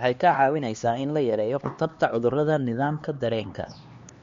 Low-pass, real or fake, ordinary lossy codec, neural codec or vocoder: 7.2 kHz; fake; MP3, 48 kbps; codec, 16 kHz, 2 kbps, X-Codec, HuBERT features, trained on LibriSpeech